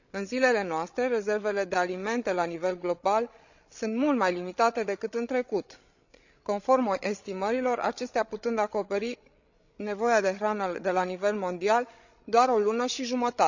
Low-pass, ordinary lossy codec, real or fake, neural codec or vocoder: 7.2 kHz; none; fake; codec, 16 kHz, 16 kbps, FreqCodec, larger model